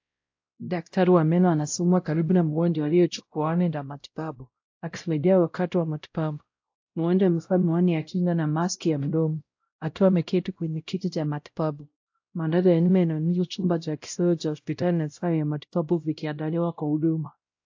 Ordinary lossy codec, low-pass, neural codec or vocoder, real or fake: AAC, 48 kbps; 7.2 kHz; codec, 16 kHz, 0.5 kbps, X-Codec, WavLM features, trained on Multilingual LibriSpeech; fake